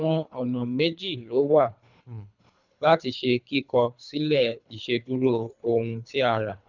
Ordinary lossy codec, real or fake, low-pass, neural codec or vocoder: none; fake; 7.2 kHz; codec, 24 kHz, 3 kbps, HILCodec